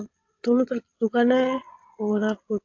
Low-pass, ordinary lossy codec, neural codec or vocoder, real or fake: 7.2 kHz; AAC, 48 kbps; vocoder, 22.05 kHz, 80 mel bands, WaveNeXt; fake